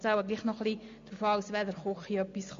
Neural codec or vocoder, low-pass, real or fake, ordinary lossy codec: none; 7.2 kHz; real; MP3, 48 kbps